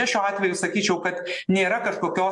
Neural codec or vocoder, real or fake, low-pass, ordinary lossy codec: none; real; 10.8 kHz; MP3, 64 kbps